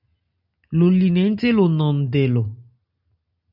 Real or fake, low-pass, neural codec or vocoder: real; 5.4 kHz; none